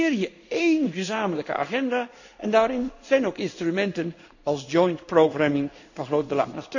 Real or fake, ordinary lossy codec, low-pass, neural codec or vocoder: fake; none; 7.2 kHz; codec, 16 kHz in and 24 kHz out, 1 kbps, XY-Tokenizer